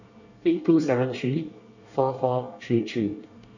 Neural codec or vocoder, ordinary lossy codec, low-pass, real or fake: codec, 24 kHz, 1 kbps, SNAC; none; 7.2 kHz; fake